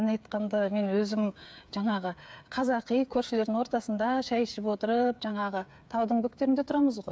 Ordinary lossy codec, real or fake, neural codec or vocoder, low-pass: none; fake; codec, 16 kHz, 8 kbps, FreqCodec, smaller model; none